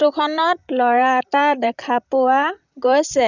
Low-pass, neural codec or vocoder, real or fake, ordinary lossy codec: 7.2 kHz; vocoder, 44.1 kHz, 128 mel bands, Pupu-Vocoder; fake; none